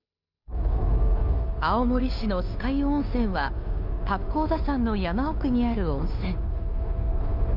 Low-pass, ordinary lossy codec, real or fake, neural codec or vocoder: 5.4 kHz; none; fake; codec, 16 kHz, 2 kbps, FunCodec, trained on Chinese and English, 25 frames a second